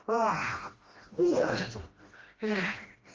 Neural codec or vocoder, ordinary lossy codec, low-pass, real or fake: codec, 16 kHz, 1 kbps, FreqCodec, smaller model; Opus, 32 kbps; 7.2 kHz; fake